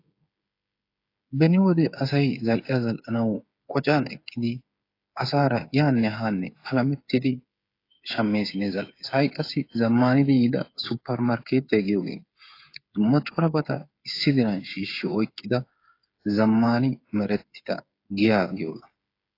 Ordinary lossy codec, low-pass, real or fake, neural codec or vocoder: AAC, 32 kbps; 5.4 kHz; fake; codec, 16 kHz, 8 kbps, FreqCodec, smaller model